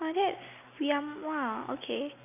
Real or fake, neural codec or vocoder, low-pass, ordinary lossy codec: real; none; 3.6 kHz; none